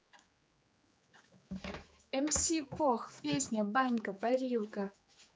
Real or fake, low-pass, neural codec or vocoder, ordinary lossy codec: fake; none; codec, 16 kHz, 4 kbps, X-Codec, HuBERT features, trained on general audio; none